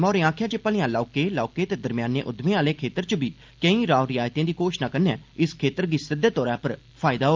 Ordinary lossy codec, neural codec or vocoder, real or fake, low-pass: Opus, 32 kbps; none; real; 7.2 kHz